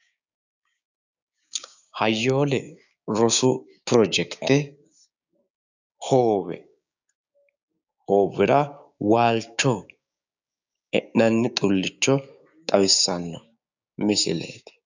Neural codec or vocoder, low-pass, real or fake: codec, 16 kHz, 6 kbps, DAC; 7.2 kHz; fake